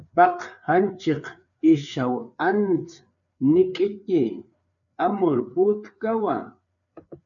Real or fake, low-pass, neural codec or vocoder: fake; 7.2 kHz; codec, 16 kHz, 4 kbps, FreqCodec, larger model